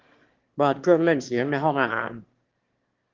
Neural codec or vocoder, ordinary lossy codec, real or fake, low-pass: autoencoder, 22.05 kHz, a latent of 192 numbers a frame, VITS, trained on one speaker; Opus, 16 kbps; fake; 7.2 kHz